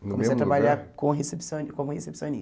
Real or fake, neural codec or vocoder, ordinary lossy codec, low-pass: real; none; none; none